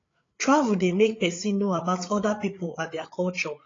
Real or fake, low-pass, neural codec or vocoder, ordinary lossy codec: fake; 7.2 kHz; codec, 16 kHz, 8 kbps, FreqCodec, larger model; AAC, 32 kbps